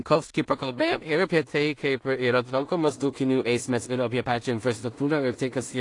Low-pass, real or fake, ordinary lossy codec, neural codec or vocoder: 10.8 kHz; fake; AAC, 48 kbps; codec, 16 kHz in and 24 kHz out, 0.4 kbps, LongCat-Audio-Codec, two codebook decoder